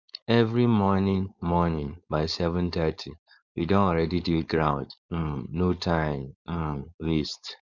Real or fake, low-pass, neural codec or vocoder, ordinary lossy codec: fake; 7.2 kHz; codec, 16 kHz, 4.8 kbps, FACodec; none